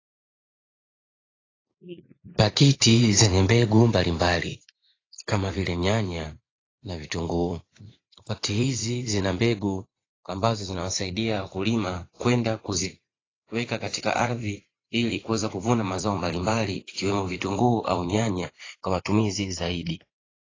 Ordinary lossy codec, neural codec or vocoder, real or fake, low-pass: AAC, 32 kbps; vocoder, 44.1 kHz, 80 mel bands, Vocos; fake; 7.2 kHz